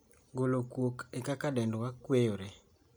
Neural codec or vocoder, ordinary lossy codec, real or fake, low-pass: none; none; real; none